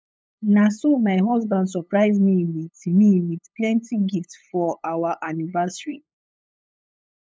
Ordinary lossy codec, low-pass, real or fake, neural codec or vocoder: none; none; fake; codec, 16 kHz, 8 kbps, FunCodec, trained on LibriTTS, 25 frames a second